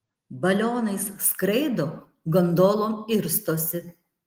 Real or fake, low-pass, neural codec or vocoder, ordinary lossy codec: real; 19.8 kHz; none; Opus, 24 kbps